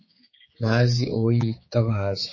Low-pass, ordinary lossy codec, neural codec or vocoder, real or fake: 7.2 kHz; MP3, 32 kbps; codec, 16 kHz, 4 kbps, X-Codec, HuBERT features, trained on general audio; fake